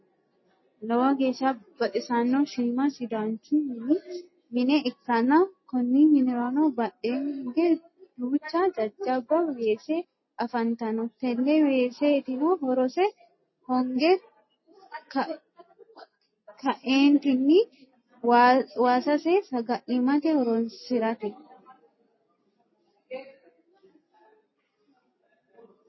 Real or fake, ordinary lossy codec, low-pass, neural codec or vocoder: real; MP3, 24 kbps; 7.2 kHz; none